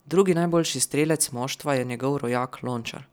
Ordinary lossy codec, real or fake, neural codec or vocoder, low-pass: none; real; none; none